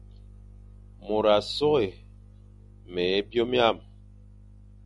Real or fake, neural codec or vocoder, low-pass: real; none; 10.8 kHz